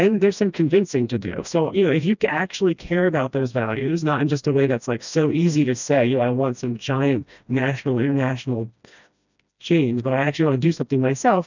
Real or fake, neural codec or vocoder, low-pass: fake; codec, 16 kHz, 1 kbps, FreqCodec, smaller model; 7.2 kHz